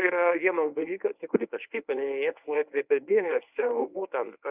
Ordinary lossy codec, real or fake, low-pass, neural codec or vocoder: Opus, 64 kbps; fake; 3.6 kHz; codec, 24 kHz, 0.9 kbps, WavTokenizer, medium speech release version 1